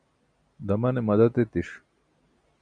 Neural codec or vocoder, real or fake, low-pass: none; real; 9.9 kHz